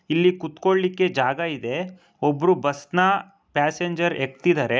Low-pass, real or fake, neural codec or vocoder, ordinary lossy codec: none; real; none; none